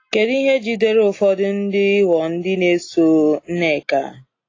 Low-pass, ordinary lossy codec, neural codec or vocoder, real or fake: 7.2 kHz; AAC, 32 kbps; none; real